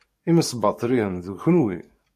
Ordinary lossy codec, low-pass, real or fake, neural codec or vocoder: MP3, 64 kbps; 14.4 kHz; fake; codec, 44.1 kHz, 7.8 kbps, DAC